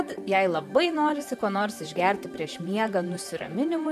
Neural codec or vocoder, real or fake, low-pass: vocoder, 44.1 kHz, 128 mel bands, Pupu-Vocoder; fake; 14.4 kHz